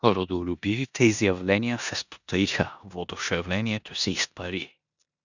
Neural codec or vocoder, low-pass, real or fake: codec, 16 kHz in and 24 kHz out, 0.9 kbps, LongCat-Audio-Codec, four codebook decoder; 7.2 kHz; fake